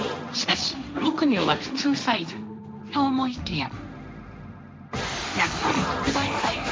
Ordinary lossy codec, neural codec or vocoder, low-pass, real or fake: none; codec, 16 kHz, 1.1 kbps, Voila-Tokenizer; none; fake